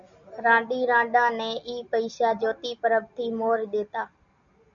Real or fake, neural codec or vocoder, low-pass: real; none; 7.2 kHz